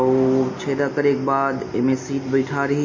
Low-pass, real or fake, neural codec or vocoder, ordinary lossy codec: 7.2 kHz; real; none; MP3, 32 kbps